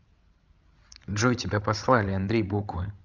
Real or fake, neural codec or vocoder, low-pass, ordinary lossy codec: fake; codec, 16 kHz, 16 kbps, FreqCodec, larger model; 7.2 kHz; Opus, 32 kbps